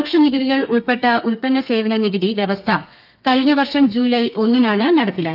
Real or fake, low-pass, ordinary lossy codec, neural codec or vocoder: fake; 5.4 kHz; none; codec, 32 kHz, 1.9 kbps, SNAC